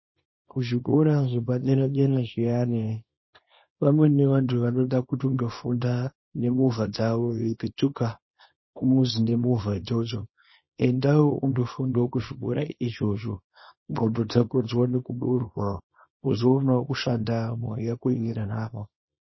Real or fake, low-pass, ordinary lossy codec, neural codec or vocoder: fake; 7.2 kHz; MP3, 24 kbps; codec, 24 kHz, 0.9 kbps, WavTokenizer, small release